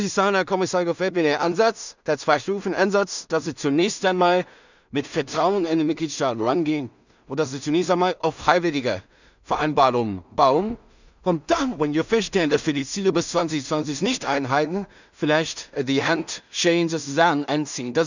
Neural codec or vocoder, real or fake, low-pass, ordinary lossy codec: codec, 16 kHz in and 24 kHz out, 0.4 kbps, LongCat-Audio-Codec, two codebook decoder; fake; 7.2 kHz; none